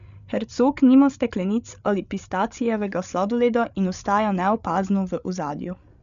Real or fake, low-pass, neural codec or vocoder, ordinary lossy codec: fake; 7.2 kHz; codec, 16 kHz, 8 kbps, FreqCodec, larger model; none